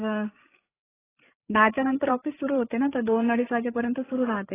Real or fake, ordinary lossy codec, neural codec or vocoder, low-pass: fake; AAC, 16 kbps; codec, 16 kHz, 8 kbps, FreqCodec, larger model; 3.6 kHz